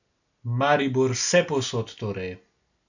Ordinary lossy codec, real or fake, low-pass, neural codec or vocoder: none; real; 7.2 kHz; none